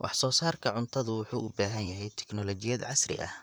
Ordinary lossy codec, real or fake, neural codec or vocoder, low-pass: none; fake; vocoder, 44.1 kHz, 128 mel bands, Pupu-Vocoder; none